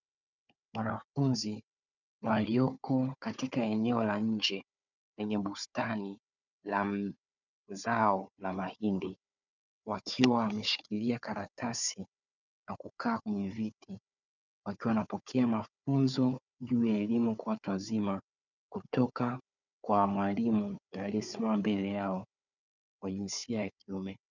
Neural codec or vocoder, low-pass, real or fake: codec, 16 kHz, 4 kbps, FunCodec, trained on Chinese and English, 50 frames a second; 7.2 kHz; fake